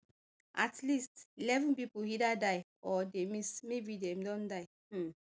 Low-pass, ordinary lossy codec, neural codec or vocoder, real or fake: none; none; none; real